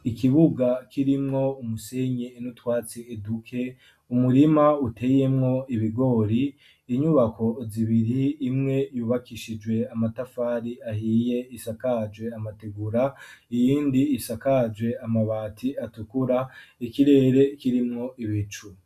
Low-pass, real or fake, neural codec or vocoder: 14.4 kHz; real; none